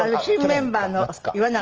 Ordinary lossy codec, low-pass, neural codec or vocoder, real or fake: Opus, 24 kbps; 7.2 kHz; none; real